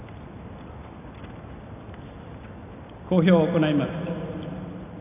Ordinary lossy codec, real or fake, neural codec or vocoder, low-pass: none; real; none; 3.6 kHz